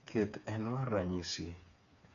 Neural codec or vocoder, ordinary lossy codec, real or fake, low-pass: codec, 16 kHz, 4 kbps, FreqCodec, smaller model; none; fake; 7.2 kHz